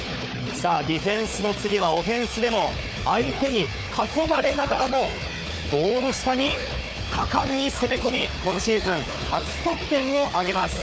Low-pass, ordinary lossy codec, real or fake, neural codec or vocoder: none; none; fake; codec, 16 kHz, 4 kbps, FunCodec, trained on Chinese and English, 50 frames a second